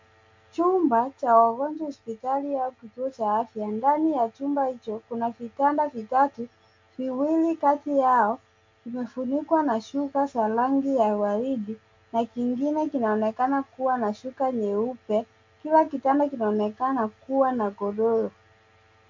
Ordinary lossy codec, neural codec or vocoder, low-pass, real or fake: MP3, 64 kbps; none; 7.2 kHz; real